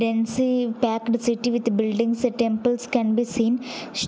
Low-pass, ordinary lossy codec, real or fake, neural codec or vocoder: none; none; real; none